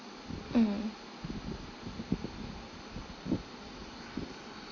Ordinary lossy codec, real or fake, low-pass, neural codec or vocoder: AAC, 32 kbps; real; 7.2 kHz; none